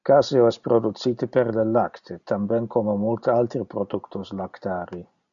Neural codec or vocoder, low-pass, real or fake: none; 7.2 kHz; real